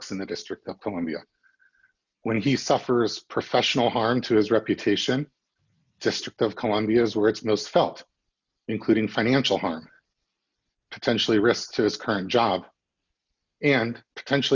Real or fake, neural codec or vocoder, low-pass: real; none; 7.2 kHz